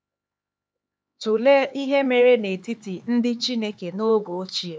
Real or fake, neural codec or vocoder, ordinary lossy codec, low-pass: fake; codec, 16 kHz, 4 kbps, X-Codec, HuBERT features, trained on LibriSpeech; none; none